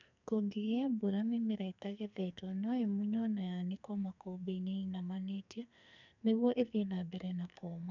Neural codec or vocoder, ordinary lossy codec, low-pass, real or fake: codec, 44.1 kHz, 2.6 kbps, SNAC; none; 7.2 kHz; fake